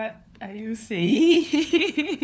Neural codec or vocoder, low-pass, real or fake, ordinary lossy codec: codec, 16 kHz, 4 kbps, FunCodec, trained on LibriTTS, 50 frames a second; none; fake; none